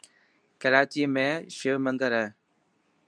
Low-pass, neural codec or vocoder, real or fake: 9.9 kHz; codec, 24 kHz, 0.9 kbps, WavTokenizer, medium speech release version 2; fake